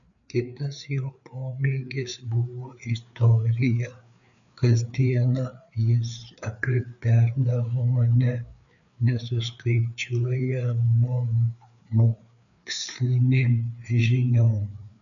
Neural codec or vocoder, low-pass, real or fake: codec, 16 kHz, 4 kbps, FreqCodec, larger model; 7.2 kHz; fake